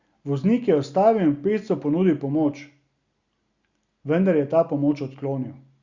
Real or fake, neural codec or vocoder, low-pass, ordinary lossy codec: real; none; 7.2 kHz; Opus, 64 kbps